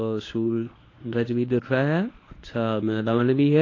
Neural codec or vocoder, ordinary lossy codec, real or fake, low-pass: codec, 24 kHz, 0.9 kbps, WavTokenizer, medium speech release version 1; AAC, 32 kbps; fake; 7.2 kHz